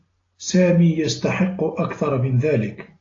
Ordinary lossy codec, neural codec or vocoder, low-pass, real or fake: AAC, 32 kbps; none; 7.2 kHz; real